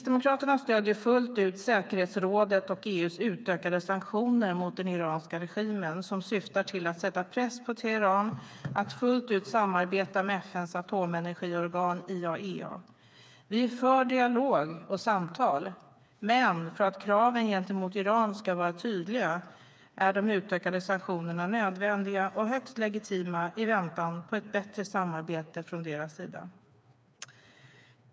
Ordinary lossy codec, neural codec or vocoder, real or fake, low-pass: none; codec, 16 kHz, 4 kbps, FreqCodec, smaller model; fake; none